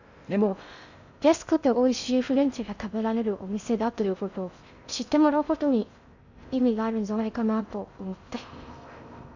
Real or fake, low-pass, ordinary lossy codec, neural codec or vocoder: fake; 7.2 kHz; none; codec, 16 kHz in and 24 kHz out, 0.6 kbps, FocalCodec, streaming, 2048 codes